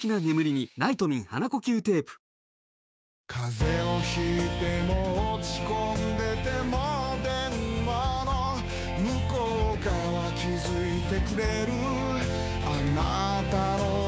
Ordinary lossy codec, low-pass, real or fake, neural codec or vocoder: none; none; fake; codec, 16 kHz, 6 kbps, DAC